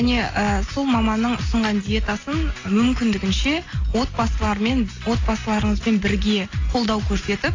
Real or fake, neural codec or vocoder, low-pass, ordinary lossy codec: real; none; 7.2 kHz; AAC, 32 kbps